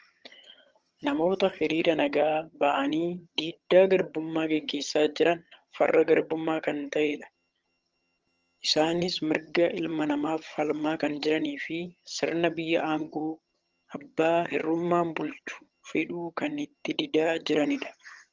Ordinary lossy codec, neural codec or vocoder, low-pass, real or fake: Opus, 24 kbps; vocoder, 22.05 kHz, 80 mel bands, HiFi-GAN; 7.2 kHz; fake